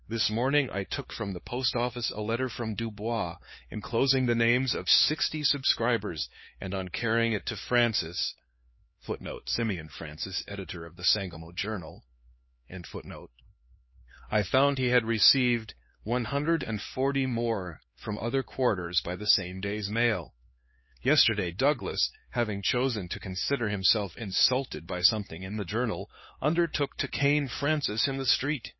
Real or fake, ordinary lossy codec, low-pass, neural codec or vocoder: fake; MP3, 24 kbps; 7.2 kHz; codec, 16 kHz, 4 kbps, X-Codec, HuBERT features, trained on LibriSpeech